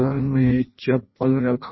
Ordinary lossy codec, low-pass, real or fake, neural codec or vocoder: MP3, 24 kbps; 7.2 kHz; fake; codec, 16 kHz in and 24 kHz out, 0.6 kbps, FireRedTTS-2 codec